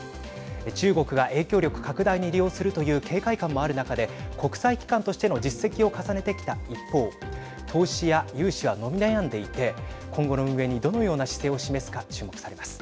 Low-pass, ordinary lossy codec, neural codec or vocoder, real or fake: none; none; none; real